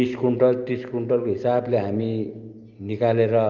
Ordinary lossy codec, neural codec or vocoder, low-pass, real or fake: Opus, 24 kbps; none; 7.2 kHz; real